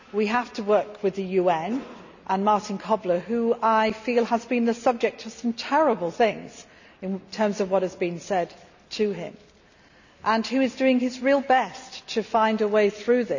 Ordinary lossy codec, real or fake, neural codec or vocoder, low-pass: none; real; none; 7.2 kHz